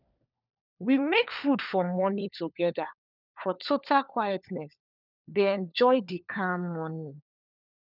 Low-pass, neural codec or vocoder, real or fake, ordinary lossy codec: 5.4 kHz; codec, 16 kHz, 4 kbps, FunCodec, trained on LibriTTS, 50 frames a second; fake; none